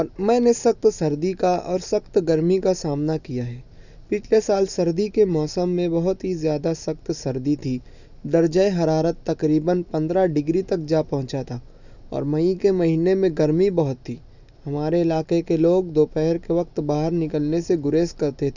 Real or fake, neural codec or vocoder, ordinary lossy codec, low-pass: real; none; none; 7.2 kHz